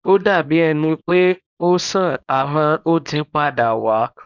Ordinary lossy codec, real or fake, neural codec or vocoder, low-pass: none; fake; codec, 24 kHz, 0.9 kbps, WavTokenizer, small release; 7.2 kHz